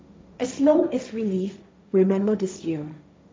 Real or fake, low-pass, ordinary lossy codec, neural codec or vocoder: fake; none; none; codec, 16 kHz, 1.1 kbps, Voila-Tokenizer